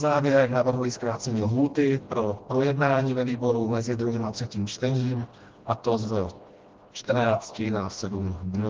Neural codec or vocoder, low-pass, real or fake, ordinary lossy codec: codec, 16 kHz, 1 kbps, FreqCodec, smaller model; 7.2 kHz; fake; Opus, 32 kbps